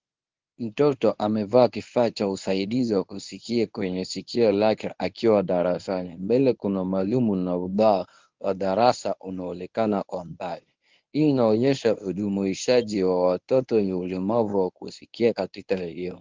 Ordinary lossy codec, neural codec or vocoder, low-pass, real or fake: Opus, 32 kbps; codec, 24 kHz, 0.9 kbps, WavTokenizer, medium speech release version 1; 7.2 kHz; fake